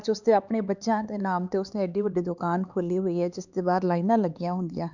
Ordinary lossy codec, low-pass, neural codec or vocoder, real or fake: none; 7.2 kHz; codec, 16 kHz, 4 kbps, X-Codec, HuBERT features, trained on LibriSpeech; fake